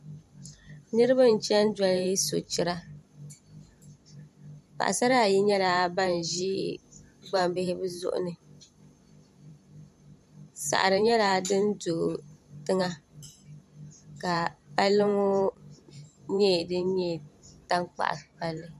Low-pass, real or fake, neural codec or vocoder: 14.4 kHz; fake; vocoder, 44.1 kHz, 128 mel bands every 512 samples, BigVGAN v2